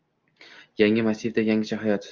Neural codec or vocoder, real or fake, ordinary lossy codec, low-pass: none; real; Opus, 24 kbps; 7.2 kHz